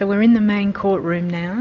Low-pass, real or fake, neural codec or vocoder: 7.2 kHz; real; none